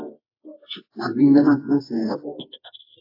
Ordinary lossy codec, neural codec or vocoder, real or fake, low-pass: AAC, 32 kbps; codec, 24 kHz, 0.9 kbps, WavTokenizer, medium music audio release; fake; 5.4 kHz